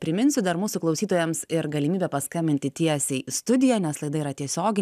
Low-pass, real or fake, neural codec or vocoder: 14.4 kHz; real; none